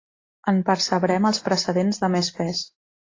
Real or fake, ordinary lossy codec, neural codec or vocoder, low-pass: real; AAC, 32 kbps; none; 7.2 kHz